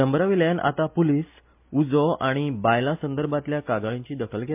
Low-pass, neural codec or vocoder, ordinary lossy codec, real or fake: 3.6 kHz; none; MP3, 32 kbps; real